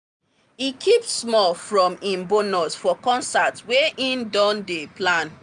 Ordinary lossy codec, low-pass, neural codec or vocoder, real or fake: none; 9.9 kHz; none; real